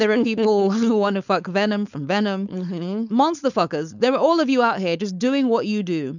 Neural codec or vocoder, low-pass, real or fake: codec, 16 kHz, 4.8 kbps, FACodec; 7.2 kHz; fake